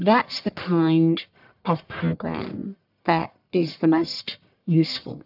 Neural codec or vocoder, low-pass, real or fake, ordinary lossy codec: codec, 44.1 kHz, 1.7 kbps, Pupu-Codec; 5.4 kHz; fake; AAC, 48 kbps